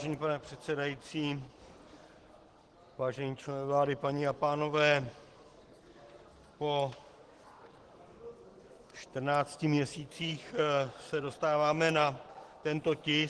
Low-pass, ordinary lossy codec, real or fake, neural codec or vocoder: 10.8 kHz; Opus, 16 kbps; real; none